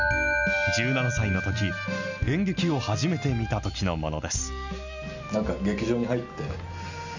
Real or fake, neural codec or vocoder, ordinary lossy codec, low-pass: real; none; none; 7.2 kHz